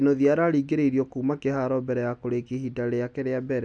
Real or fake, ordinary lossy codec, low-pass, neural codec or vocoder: real; none; 9.9 kHz; none